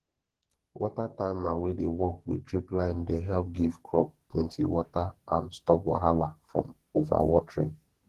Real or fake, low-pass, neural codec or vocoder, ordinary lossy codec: fake; 14.4 kHz; codec, 44.1 kHz, 2.6 kbps, SNAC; Opus, 16 kbps